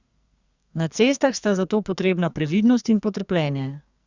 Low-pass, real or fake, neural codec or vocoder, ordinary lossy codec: 7.2 kHz; fake; codec, 44.1 kHz, 2.6 kbps, SNAC; Opus, 64 kbps